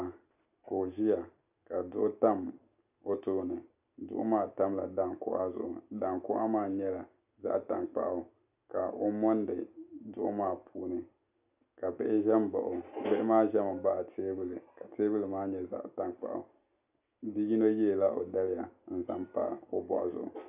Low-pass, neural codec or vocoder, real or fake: 3.6 kHz; none; real